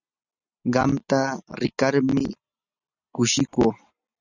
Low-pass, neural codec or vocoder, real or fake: 7.2 kHz; none; real